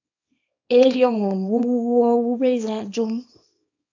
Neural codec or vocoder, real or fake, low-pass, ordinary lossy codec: codec, 24 kHz, 0.9 kbps, WavTokenizer, small release; fake; 7.2 kHz; AAC, 48 kbps